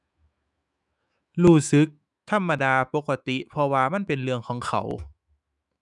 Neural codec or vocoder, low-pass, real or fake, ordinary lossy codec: autoencoder, 48 kHz, 128 numbers a frame, DAC-VAE, trained on Japanese speech; 10.8 kHz; fake; none